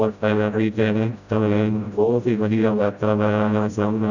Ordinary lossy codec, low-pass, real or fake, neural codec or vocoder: none; 7.2 kHz; fake; codec, 16 kHz, 0.5 kbps, FreqCodec, smaller model